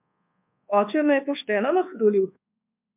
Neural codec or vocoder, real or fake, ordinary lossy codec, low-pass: codec, 24 kHz, 1.2 kbps, DualCodec; fake; MP3, 32 kbps; 3.6 kHz